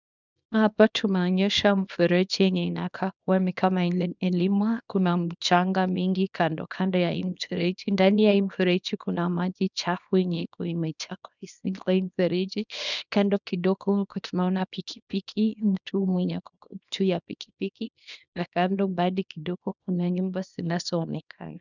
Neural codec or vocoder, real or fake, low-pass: codec, 24 kHz, 0.9 kbps, WavTokenizer, small release; fake; 7.2 kHz